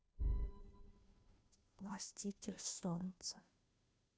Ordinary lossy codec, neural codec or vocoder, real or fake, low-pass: none; codec, 16 kHz, 0.5 kbps, FunCodec, trained on Chinese and English, 25 frames a second; fake; none